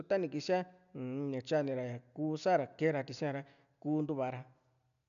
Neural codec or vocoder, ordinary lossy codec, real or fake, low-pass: none; none; real; 7.2 kHz